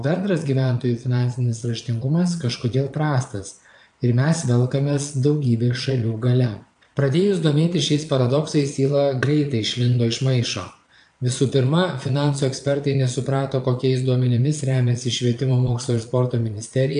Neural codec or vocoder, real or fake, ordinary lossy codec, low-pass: vocoder, 22.05 kHz, 80 mel bands, Vocos; fake; AAC, 64 kbps; 9.9 kHz